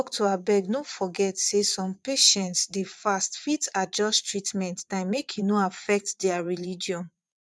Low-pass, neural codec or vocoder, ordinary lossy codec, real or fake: none; vocoder, 22.05 kHz, 80 mel bands, Vocos; none; fake